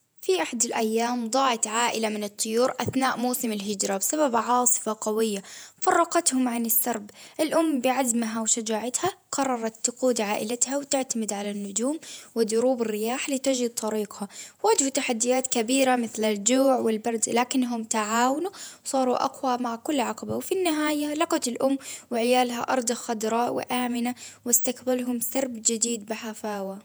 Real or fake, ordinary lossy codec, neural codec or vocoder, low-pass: fake; none; vocoder, 48 kHz, 128 mel bands, Vocos; none